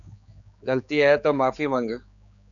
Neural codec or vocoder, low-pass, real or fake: codec, 16 kHz, 4 kbps, X-Codec, HuBERT features, trained on general audio; 7.2 kHz; fake